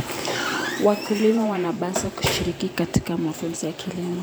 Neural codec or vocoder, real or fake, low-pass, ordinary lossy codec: vocoder, 44.1 kHz, 128 mel bands every 512 samples, BigVGAN v2; fake; none; none